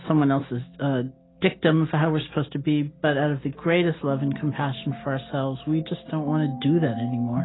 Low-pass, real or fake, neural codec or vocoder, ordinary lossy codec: 7.2 kHz; real; none; AAC, 16 kbps